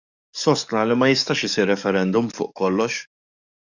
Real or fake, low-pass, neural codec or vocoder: fake; 7.2 kHz; codec, 44.1 kHz, 7.8 kbps, DAC